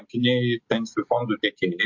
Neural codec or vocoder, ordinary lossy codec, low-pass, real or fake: none; MP3, 48 kbps; 7.2 kHz; real